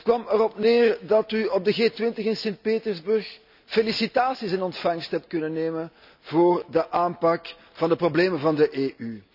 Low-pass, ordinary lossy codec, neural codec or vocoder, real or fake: 5.4 kHz; none; none; real